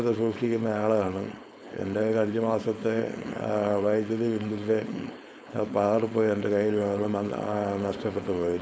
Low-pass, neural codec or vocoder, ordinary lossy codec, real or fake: none; codec, 16 kHz, 4.8 kbps, FACodec; none; fake